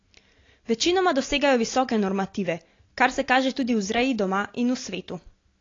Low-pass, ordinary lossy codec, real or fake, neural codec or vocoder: 7.2 kHz; AAC, 32 kbps; real; none